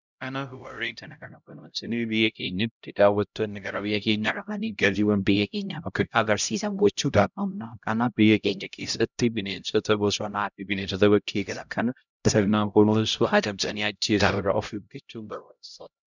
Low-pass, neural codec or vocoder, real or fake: 7.2 kHz; codec, 16 kHz, 0.5 kbps, X-Codec, HuBERT features, trained on LibriSpeech; fake